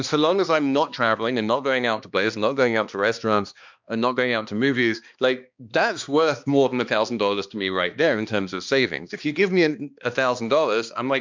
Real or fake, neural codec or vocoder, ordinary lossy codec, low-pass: fake; codec, 16 kHz, 2 kbps, X-Codec, HuBERT features, trained on balanced general audio; MP3, 64 kbps; 7.2 kHz